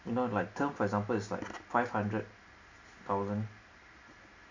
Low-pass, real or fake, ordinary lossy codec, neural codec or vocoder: 7.2 kHz; real; MP3, 64 kbps; none